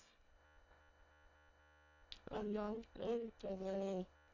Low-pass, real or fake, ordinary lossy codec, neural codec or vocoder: 7.2 kHz; fake; none; codec, 24 kHz, 1.5 kbps, HILCodec